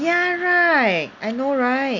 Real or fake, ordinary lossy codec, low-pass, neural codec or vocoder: real; none; 7.2 kHz; none